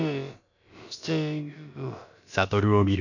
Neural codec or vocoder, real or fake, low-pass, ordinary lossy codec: codec, 16 kHz, about 1 kbps, DyCAST, with the encoder's durations; fake; 7.2 kHz; none